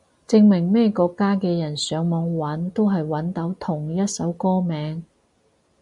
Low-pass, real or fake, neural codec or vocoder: 10.8 kHz; real; none